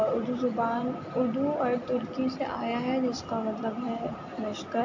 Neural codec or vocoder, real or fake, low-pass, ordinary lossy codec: none; real; 7.2 kHz; AAC, 48 kbps